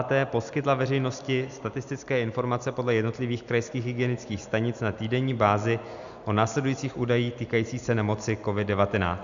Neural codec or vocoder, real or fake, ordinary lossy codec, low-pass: none; real; MP3, 96 kbps; 7.2 kHz